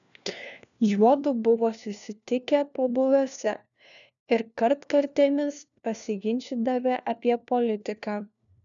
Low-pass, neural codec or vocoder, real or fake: 7.2 kHz; codec, 16 kHz, 1 kbps, FunCodec, trained on LibriTTS, 50 frames a second; fake